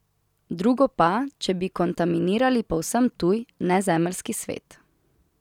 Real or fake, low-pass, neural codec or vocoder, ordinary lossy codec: real; 19.8 kHz; none; none